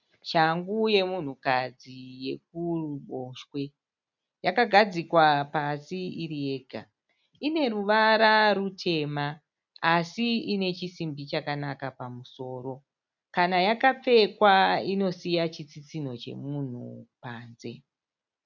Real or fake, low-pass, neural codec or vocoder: real; 7.2 kHz; none